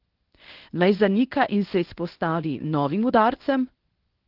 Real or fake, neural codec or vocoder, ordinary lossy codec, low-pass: fake; codec, 24 kHz, 0.9 kbps, WavTokenizer, medium speech release version 1; Opus, 16 kbps; 5.4 kHz